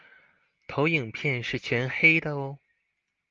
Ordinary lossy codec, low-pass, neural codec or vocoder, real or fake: Opus, 24 kbps; 7.2 kHz; none; real